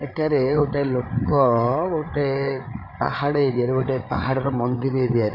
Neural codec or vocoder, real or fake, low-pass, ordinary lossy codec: codec, 16 kHz, 8 kbps, FreqCodec, larger model; fake; 5.4 kHz; none